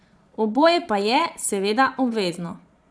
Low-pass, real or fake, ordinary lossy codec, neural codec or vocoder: none; fake; none; vocoder, 22.05 kHz, 80 mel bands, Vocos